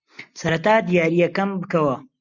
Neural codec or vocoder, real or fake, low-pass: none; real; 7.2 kHz